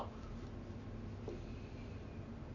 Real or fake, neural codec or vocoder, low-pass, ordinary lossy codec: real; none; 7.2 kHz; none